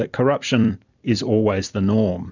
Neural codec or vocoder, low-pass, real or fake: vocoder, 44.1 kHz, 128 mel bands every 256 samples, BigVGAN v2; 7.2 kHz; fake